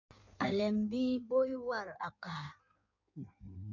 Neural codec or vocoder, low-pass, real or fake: codec, 16 kHz in and 24 kHz out, 1.1 kbps, FireRedTTS-2 codec; 7.2 kHz; fake